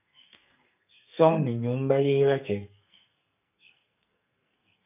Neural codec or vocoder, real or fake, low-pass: codec, 44.1 kHz, 2.6 kbps, SNAC; fake; 3.6 kHz